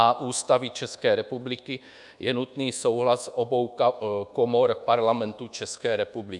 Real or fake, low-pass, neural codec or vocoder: fake; 10.8 kHz; codec, 24 kHz, 1.2 kbps, DualCodec